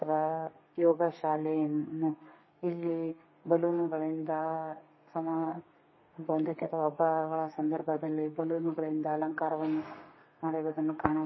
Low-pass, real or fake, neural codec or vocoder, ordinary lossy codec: 7.2 kHz; fake; codec, 32 kHz, 1.9 kbps, SNAC; MP3, 24 kbps